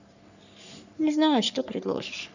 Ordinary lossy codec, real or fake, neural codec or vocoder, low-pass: none; fake; codec, 44.1 kHz, 3.4 kbps, Pupu-Codec; 7.2 kHz